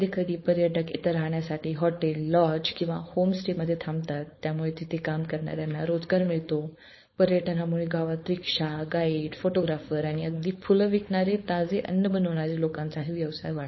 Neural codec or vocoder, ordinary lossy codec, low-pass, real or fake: codec, 16 kHz, 4.8 kbps, FACodec; MP3, 24 kbps; 7.2 kHz; fake